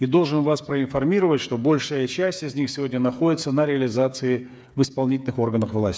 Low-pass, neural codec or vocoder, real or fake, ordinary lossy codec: none; codec, 16 kHz, 8 kbps, FreqCodec, smaller model; fake; none